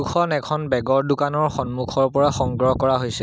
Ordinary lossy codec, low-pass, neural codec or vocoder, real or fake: none; none; none; real